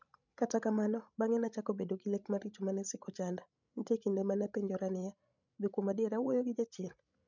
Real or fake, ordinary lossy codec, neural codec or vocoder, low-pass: fake; none; codec, 16 kHz, 16 kbps, FreqCodec, larger model; 7.2 kHz